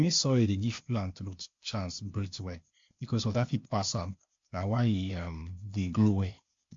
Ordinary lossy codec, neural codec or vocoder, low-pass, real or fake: MP3, 48 kbps; codec, 16 kHz, 0.8 kbps, ZipCodec; 7.2 kHz; fake